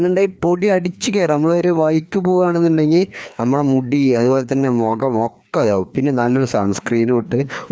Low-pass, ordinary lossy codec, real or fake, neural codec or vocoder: none; none; fake; codec, 16 kHz, 2 kbps, FreqCodec, larger model